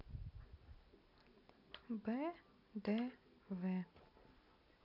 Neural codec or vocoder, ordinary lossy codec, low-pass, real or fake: none; none; 5.4 kHz; real